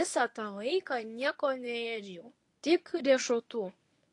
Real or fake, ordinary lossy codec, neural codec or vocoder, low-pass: fake; AAC, 48 kbps; codec, 24 kHz, 0.9 kbps, WavTokenizer, medium speech release version 1; 10.8 kHz